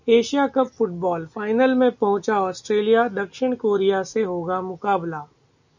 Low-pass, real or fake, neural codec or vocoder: 7.2 kHz; real; none